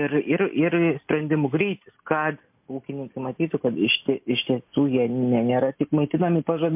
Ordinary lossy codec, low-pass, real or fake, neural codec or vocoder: MP3, 32 kbps; 3.6 kHz; real; none